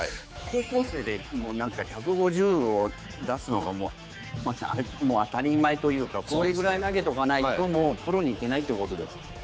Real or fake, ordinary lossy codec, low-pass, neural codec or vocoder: fake; none; none; codec, 16 kHz, 4 kbps, X-Codec, HuBERT features, trained on general audio